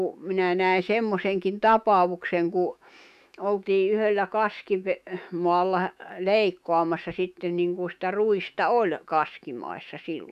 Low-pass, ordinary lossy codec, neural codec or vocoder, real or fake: 14.4 kHz; none; autoencoder, 48 kHz, 128 numbers a frame, DAC-VAE, trained on Japanese speech; fake